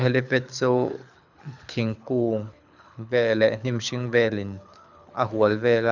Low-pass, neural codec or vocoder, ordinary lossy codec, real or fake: 7.2 kHz; codec, 24 kHz, 6 kbps, HILCodec; none; fake